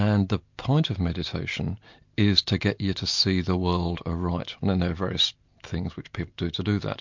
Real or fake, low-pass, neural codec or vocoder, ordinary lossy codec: real; 7.2 kHz; none; MP3, 64 kbps